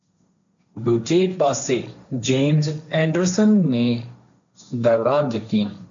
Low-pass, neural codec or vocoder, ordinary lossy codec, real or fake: 7.2 kHz; codec, 16 kHz, 1.1 kbps, Voila-Tokenizer; MP3, 64 kbps; fake